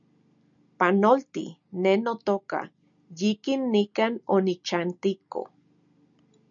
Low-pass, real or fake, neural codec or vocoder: 7.2 kHz; real; none